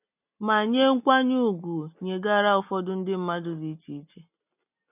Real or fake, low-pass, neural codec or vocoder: real; 3.6 kHz; none